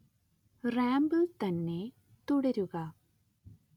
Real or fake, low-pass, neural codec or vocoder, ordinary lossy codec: real; 19.8 kHz; none; none